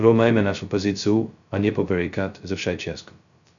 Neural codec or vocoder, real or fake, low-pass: codec, 16 kHz, 0.2 kbps, FocalCodec; fake; 7.2 kHz